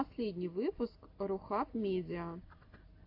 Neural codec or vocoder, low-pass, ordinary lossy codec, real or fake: none; 5.4 kHz; AAC, 32 kbps; real